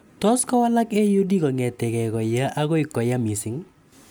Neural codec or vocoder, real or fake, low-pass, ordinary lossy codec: none; real; none; none